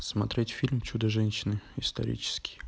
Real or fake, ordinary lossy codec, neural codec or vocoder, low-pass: real; none; none; none